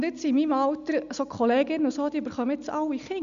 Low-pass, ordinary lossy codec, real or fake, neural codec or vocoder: 7.2 kHz; none; real; none